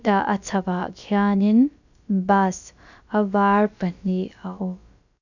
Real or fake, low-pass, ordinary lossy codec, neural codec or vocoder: fake; 7.2 kHz; none; codec, 16 kHz, about 1 kbps, DyCAST, with the encoder's durations